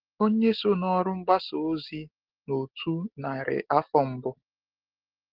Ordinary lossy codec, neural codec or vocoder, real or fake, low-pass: Opus, 16 kbps; none; real; 5.4 kHz